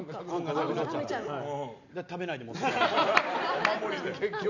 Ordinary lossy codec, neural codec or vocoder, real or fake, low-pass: none; none; real; 7.2 kHz